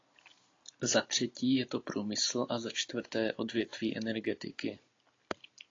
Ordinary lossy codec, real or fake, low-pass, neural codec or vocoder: AAC, 32 kbps; real; 7.2 kHz; none